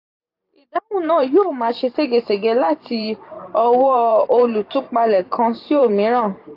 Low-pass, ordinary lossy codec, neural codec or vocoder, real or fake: 5.4 kHz; AAC, 32 kbps; vocoder, 44.1 kHz, 128 mel bands every 512 samples, BigVGAN v2; fake